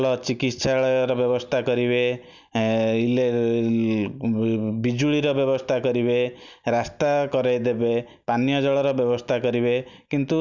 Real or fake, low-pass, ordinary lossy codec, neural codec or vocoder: real; 7.2 kHz; none; none